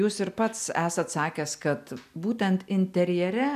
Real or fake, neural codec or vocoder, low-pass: real; none; 14.4 kHz